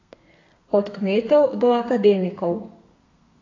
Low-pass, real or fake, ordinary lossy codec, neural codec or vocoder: 7.2 kHz; fake; AAC, 32 kbps; codec, 32 kHz, 1.9 kbps, SNAC